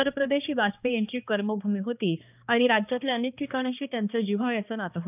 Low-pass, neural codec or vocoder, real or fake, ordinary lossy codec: 3.6 kHz; codec, 16 kHz, 2 kbps, X-Codec, HuBERT features, trained on balanced general audio; fake; none